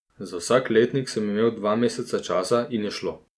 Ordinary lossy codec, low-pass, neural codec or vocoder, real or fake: none; none; none; real